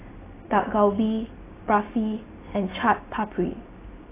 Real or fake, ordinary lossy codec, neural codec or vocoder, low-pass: real; AAC, 16 kbps; none; 3.6 kHz